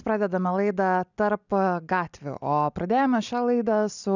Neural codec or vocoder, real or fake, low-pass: none; real; 7.2 kHz